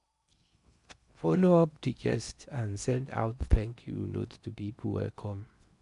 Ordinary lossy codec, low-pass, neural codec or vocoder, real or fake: none; 10.8 kHz; codec, 16 kHz in and 24 kHz out, 0.8 kbps, FocalCodec, streaming, 65536 codes; fake